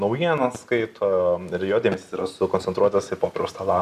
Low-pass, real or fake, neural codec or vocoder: 14.4 kHz; real; none